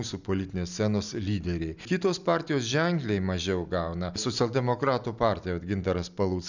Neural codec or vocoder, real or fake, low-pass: none; real; 7.2 kHz